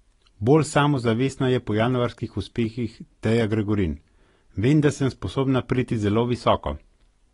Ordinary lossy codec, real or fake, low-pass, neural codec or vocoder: AAC, 32 kbps; real; 10.8 kHz; none